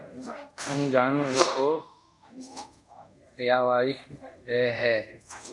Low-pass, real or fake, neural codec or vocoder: 10.8 kHz; fake; codec, 24 kHz, 0.5 kbps, DualCodec